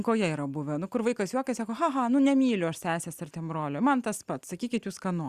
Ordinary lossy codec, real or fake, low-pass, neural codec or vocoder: Opus, 64 kbps; real; 14.4 kHz; none